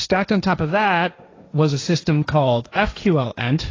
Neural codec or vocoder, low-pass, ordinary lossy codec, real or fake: codec, 16 kHz, 1.1 kbps, Voila-Tokenizer; 7.2 kHz; AAC, 32 kbps; fake